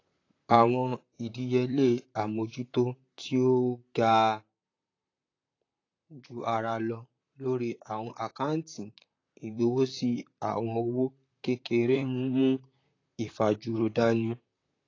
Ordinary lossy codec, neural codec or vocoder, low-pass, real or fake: AAC, 32 kbps; vocoder, 44.1 kHz, 128 mel bands, Pupu-Vocoder; 7.2 kHz; fake